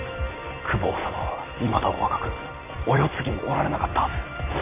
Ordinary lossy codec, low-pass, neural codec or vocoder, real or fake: AAC, 24 kbps; 3.6 kHz; vocoder, 44.1 kHz, 128 mel bands every 256 samples, BigVGAN v2; fake